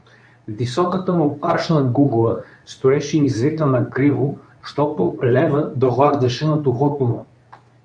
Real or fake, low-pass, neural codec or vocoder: fake; 9.9 kHz; codec, 24 kHz, 0.9 kbps, WavTokenizer, medium speech release version 2